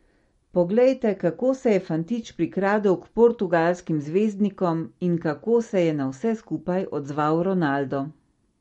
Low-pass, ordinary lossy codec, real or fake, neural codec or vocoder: 19.8 kHz; MP3, 48 kbps; real; none